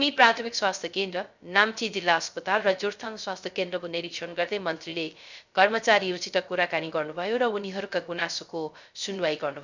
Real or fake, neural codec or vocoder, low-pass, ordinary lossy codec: fake; codec, 16 kHz, 0.3 kbps, FocalCodec; 7.2 kHz; none